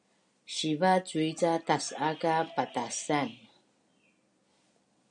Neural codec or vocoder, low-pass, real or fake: none; 9.9 kHz; real